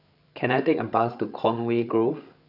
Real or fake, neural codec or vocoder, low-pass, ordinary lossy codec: fake; codec, 16 kHz, 8 kbps, FreqCodec, larger model; 5.4 kHz; none